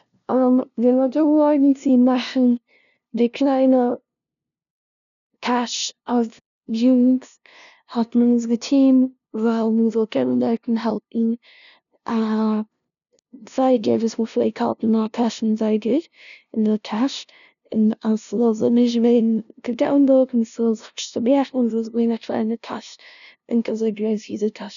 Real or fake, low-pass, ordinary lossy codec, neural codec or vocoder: fake; 7.2 kHz; none; codec, 16 kHz, 0.5 kbps, FunCodec, trained on LibriTTS, 25 frames a second